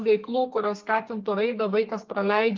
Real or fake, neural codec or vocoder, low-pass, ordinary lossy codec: fake; codec, 32 kHz, 1.9 kbps, SNAC; 7.2 kHz; Opus, 24 kbps